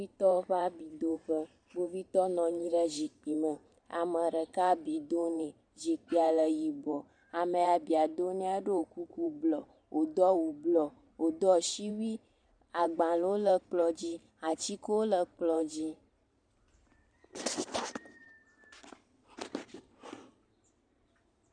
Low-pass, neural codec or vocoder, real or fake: 9.9 kHz; vocoder, 44.1 kHz, 128 mel bands every 512 samples, BigVGAN v2; fake